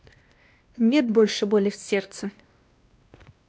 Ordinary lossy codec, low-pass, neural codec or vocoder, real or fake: none; none; codec, 16 kHz, 1 kbps, X-Codec, WavLM features, trained on Multilingual LibriSpeech; fake